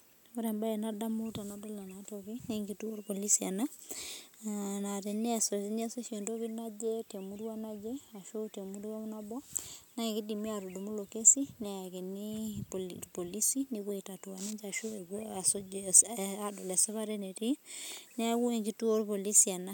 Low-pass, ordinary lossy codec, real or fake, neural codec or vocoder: none; none; real; none